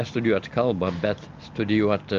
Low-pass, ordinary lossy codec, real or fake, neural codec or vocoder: 7.2 kHz; Opus, 32 kbps; real; none